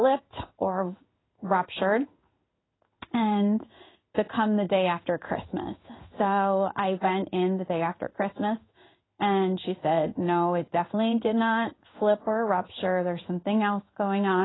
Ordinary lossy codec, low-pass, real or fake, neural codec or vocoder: AAC, 16 kbps; 7.2 kHz; fake; codec, 16 kHz in and 24 kHz out, 1 kbps, XY-Tokenizer